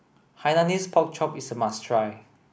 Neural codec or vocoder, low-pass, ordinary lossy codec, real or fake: none; none; none; real